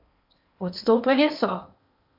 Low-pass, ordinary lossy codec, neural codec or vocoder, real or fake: 5.4 kHz; MP3, 48 kbps; codec, 16 kHz in and 24 kHz out, 0.8 kbps, FocalCodec, streaming, 65536 codes; fake